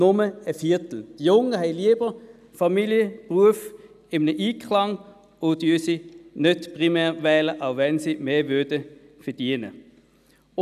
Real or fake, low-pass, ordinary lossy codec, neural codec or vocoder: real; 14.4 kHz; none; none